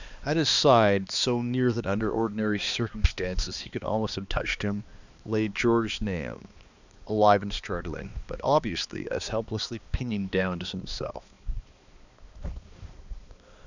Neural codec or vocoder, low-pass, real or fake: codec, 16 kHz, 2 kbps, X-Codec, HuBERT features, trained on balanced general audio; 7.2 kHz; fake